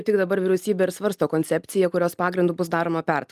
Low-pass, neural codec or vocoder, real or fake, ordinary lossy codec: 14.4 kHz; none; real; Opus, 32 kbps